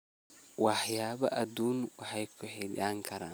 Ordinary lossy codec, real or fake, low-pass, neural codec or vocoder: none; real; none; none